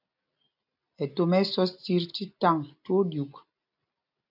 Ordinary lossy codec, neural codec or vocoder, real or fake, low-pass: MP3, 48 kbps; none; real; 5.4 kHz